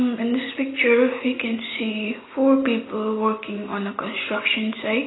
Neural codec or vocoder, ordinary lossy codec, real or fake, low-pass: none; AAC, 16 kbps; real; 7.2 kHz